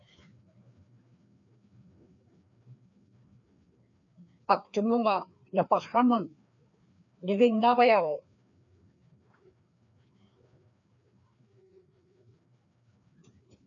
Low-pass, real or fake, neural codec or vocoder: 7.2 kHz; fake; codec, 16 kHz, 2 kbps, FreqCodec, larger model